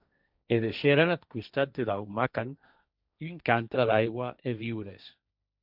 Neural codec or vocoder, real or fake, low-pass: codec, 16 kHz, 1.1 kbps, Voila-Tokenizer; fake; 5.4 kHz